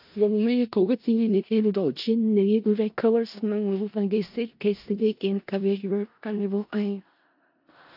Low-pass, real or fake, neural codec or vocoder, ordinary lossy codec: 5.4 kHz; fake; codec, 16 kHz in and 24 kHz out, 0.4 kbps, LongCat-Audio-Codec, four codebook decoder; AAC, 48 kbps